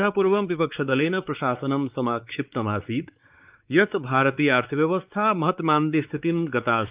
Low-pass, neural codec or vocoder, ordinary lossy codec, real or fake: 3.6 kHz; codec, 16 kHz, 4 kbps, X-Codec, WavLM features, trained on Multilingual LibriSpeech; Opus, 24 kbps; fake